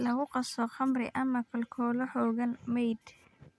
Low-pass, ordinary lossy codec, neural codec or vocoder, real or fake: none; none; none; real